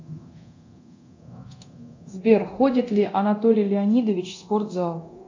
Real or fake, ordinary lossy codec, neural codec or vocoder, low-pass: fake; AAC, 48 kbps; codec, 24 kHz, 0.9 kbps, DualCodec; 7.2 kHz